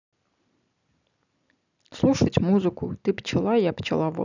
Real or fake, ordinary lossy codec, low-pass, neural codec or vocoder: real; none; 7.2 kHz; none